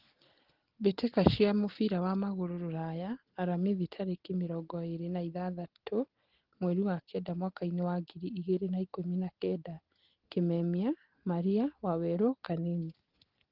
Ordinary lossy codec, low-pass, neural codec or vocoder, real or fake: Opus, 16 kbps; 5.4 kHz; none; real